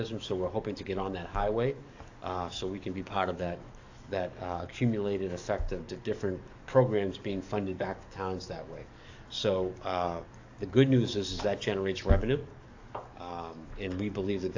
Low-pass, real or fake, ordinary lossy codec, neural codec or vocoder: 7.2 kHz; fake; AAC, 48 kbps; codec, 44.1 kHz, 7.8 kbps, DAC